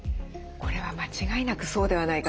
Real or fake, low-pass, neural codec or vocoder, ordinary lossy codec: real; none; none; none